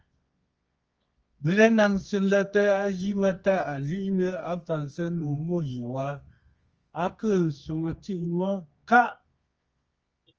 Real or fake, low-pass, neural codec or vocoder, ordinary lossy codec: fake; 7.2 kHz; codec, 24 kHz, 0.9 kbps, WavTokenizer, medium music audio release; Opus, 24 kbps